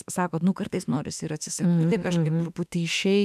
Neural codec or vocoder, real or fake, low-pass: autoencoder, 48 kHz, 32 numbers a frame, DAC-VAE, trained on Japanese speech; fake; 14.4 kHz